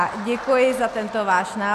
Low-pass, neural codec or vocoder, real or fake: 14.4 kHz; none; real